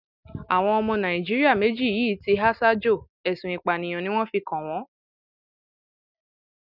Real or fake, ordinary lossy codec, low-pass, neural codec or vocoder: real; none; 5.4 kHz; none